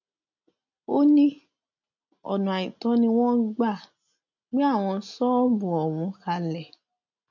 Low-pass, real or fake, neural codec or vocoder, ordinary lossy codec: 7.2 kHz; real; none; none